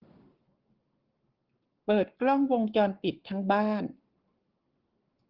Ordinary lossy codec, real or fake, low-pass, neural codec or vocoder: Opus, 16 kbps; fake; 5.4 kHz; codec, 44.1 kHz, 7.8 kbps, Pupu-Codec